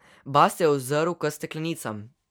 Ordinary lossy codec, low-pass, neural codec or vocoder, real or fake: none; none; none; real